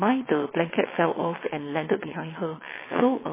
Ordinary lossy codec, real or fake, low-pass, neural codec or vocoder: MP3, 16 kbps; fake; 3.6 kHz; vocoder, 22.05 kHz, 80 mel bands, WaveNeXt